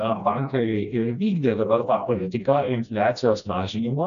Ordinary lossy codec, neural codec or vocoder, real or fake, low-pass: MP3, 96 kbps; codec, 16 kHz, 1 kbps, FreqCodec, smaller model; fake; 7.2 kHz